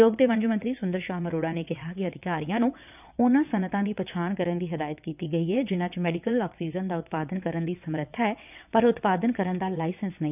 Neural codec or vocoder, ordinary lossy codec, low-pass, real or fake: vocoder, 22.05 kHz, 80 mel bands, WaveNeXt; none; 3.6 kHz; fake